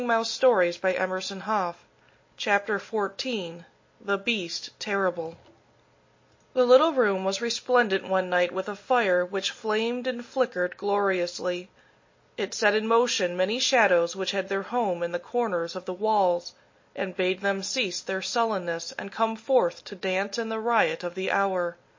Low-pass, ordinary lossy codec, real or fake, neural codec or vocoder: 7.2 kHz; MP3, 32 kbps; real; none